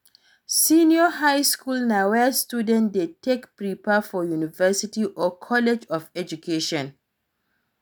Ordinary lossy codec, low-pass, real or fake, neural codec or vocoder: none; none; real; none